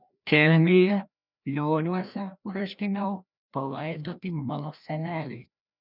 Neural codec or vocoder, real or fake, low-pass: codec, 16 kHz, 1 kbps, FreqCodec, larger model; fake; 5.4 kHz